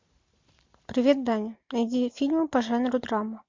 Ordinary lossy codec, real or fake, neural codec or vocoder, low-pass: MP3, 48 kbps; real; none; 7.2 kHz